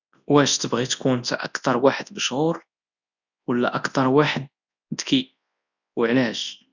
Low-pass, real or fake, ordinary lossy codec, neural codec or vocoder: 7.2 kHz; fake; none; codec, 24 kHz, 0.9 kbps, WavTokenizer, large speech release